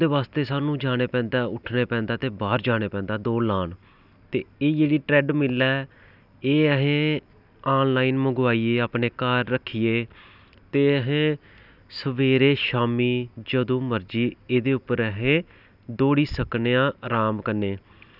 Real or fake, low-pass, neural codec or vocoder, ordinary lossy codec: real; 5.4 kHz; none; none